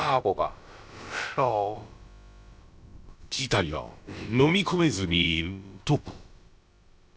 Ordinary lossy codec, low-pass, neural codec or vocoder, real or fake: none; none; codec, 16 kHz, about 1 kbps, DyCAST, with the encoder's durations; fake